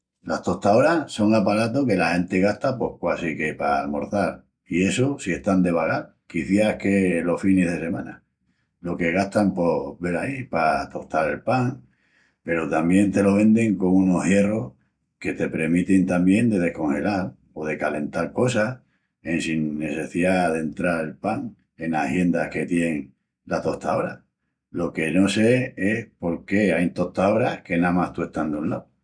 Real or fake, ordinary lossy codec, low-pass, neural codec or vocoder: real; MP3, 96 kbps; 9.9 kHz; none